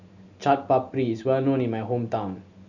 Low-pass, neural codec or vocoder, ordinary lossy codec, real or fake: 7.2 kHz; none; none; real